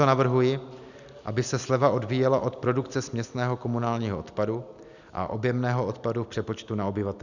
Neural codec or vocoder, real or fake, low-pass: none; real; 7.2 kHz